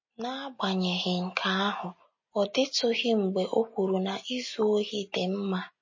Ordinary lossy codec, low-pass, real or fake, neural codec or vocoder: MP3, 32 kbps; 7.2 kHz; real; none